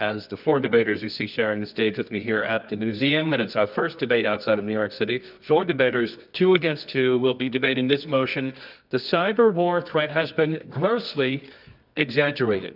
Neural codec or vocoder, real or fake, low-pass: codec, 24 kHz, 0.9 kbps, WavTokenizer, medium music audio release; fake; 5.4 kHz